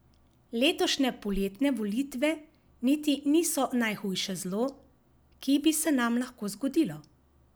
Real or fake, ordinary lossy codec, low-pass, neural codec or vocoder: real; none; none; none